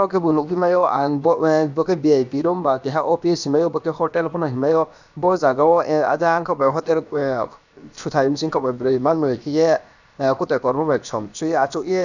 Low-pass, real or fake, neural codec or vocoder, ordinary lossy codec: 7.2 kHz; fake; codec, 16 kHz, about 1 kbps, DyCAST, with the encoder's durations; none